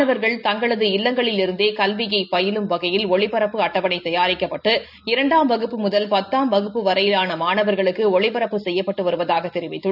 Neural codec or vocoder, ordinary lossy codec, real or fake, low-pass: none; none; real; 5.4 kHz